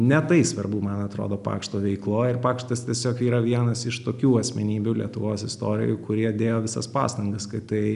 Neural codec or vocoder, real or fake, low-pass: none; real; 10.8 kHz